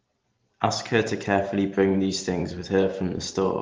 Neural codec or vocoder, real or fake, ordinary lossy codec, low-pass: none; real; Opus, 16 kbps; 7.2 kHz